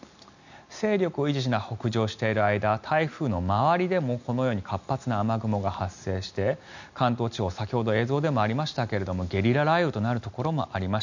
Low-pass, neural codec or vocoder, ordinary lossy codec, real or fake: 7.2 kHz; none; none; real